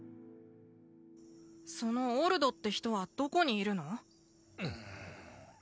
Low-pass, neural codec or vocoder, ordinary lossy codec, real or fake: none; none; none; real